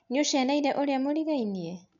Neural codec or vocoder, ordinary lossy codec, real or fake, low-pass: none; none; real; 7.2 kHz